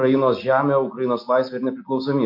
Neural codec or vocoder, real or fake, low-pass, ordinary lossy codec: none; real; 5.4 kHz; MP3, 32 kbps